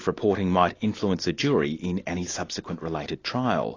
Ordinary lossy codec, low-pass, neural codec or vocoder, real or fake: AAC, 32 kbps; 7.2 kHz; none; real